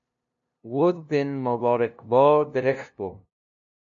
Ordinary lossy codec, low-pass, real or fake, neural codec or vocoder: AAC, 64 kbps; 7.2 kHz; fake; codec, 16 kHz, 0.5 kbps, FunCodec, trained on LibriTTS, 25 frames a second